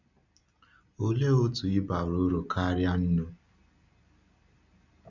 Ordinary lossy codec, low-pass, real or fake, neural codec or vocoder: none; 7.2 kHz; real; none